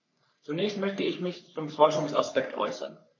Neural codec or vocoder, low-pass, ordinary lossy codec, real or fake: codec, 44.1 kHz, 3.4 kbps, Pupu-Codec; 7.2 kHz; AAC, 48 kbps; fake